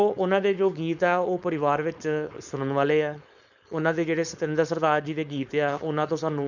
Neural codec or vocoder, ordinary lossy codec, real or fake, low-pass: codec, 16 kHz, 4.8 kbps, FACodec; none; fake; 7.2 kHz